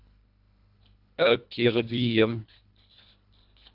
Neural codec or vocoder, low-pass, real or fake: codec, 24 kHz, 1.5 kbps, HILCodec; 5.4 kHz; fake